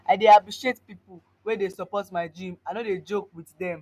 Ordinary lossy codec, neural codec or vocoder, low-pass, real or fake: none; none; 14.4 kHz; real